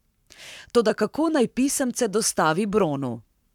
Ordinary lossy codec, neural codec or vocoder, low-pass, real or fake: none; codec, 44.1 kHz, 7.8 kbps, Pupu-Codec; 19.8 kHz; fake